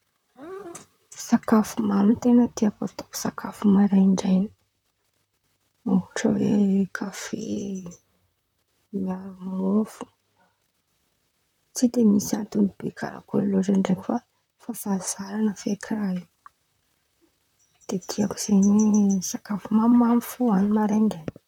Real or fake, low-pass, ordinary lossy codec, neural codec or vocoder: fake; 19.8 kHz; none; vocoder, 44.1 kHz, 128 mel bands, Pupu-Vocoder